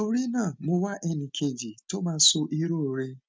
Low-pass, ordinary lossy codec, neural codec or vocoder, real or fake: none; none; none; real